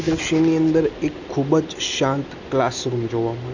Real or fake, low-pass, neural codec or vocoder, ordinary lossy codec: real; 7.2 kHz; none; none